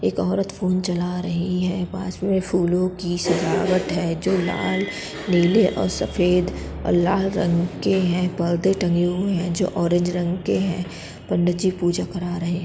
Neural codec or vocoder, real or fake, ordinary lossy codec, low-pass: none; real; none; none